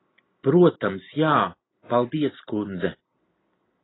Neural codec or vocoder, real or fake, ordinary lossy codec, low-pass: none; real; AAC, 16 kbps; 7.2 kHz